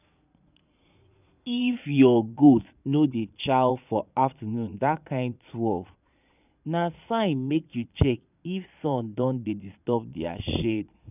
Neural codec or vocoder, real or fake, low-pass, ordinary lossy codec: vocoder, 22.05 kHz, 80 mel bands, Vocos; fake; 3.6 kHz; none